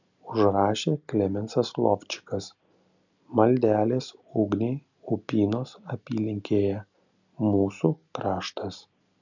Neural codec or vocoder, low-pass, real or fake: none; 7.2 kHz; real